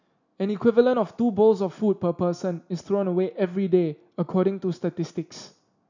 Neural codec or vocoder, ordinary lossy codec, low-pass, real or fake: none; none; 7.2 kHz; real